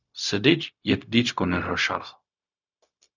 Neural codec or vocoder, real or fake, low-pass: codec, 16 kHz, 0.4 kbps, LongCat-Audio-Codec; fake; 7.2 kHz